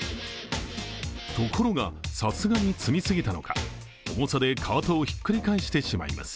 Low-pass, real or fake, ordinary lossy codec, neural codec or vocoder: none; real; none; none